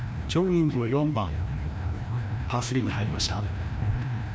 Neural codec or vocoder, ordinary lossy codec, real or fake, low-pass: codec, 16 kHz, 1 kbps, FreqCodec, larger model; none; fake; none